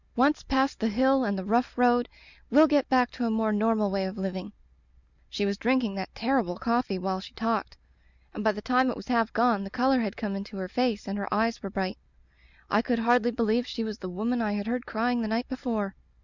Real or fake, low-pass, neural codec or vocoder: real; 7.2 kHz; none